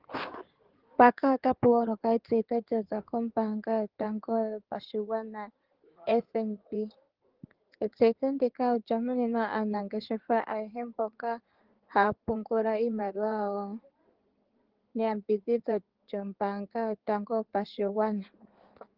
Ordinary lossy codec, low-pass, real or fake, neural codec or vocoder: Opus, 16 kbps; 5.4 kHz; fake; codec, 16 kHz in and 24 kHz out, 2.2 kbps, FireRedTTS-2 codec